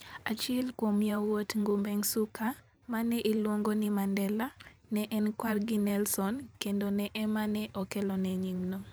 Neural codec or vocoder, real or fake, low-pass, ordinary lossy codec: vocoder, 44.1 kHz, 128 mel bands every 512 samples, BigVGAN v2; fake; none; none